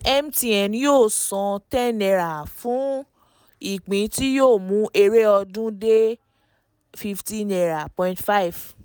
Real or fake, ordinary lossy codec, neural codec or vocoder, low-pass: real; none; none; none